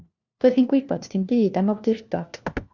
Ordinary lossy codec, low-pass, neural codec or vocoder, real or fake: Opus, 64 kbps; 7.2 kHz; codec, 16 kHz, 1 kbps, FunCodec, trained on LibriTTS, 50 frames a second; fake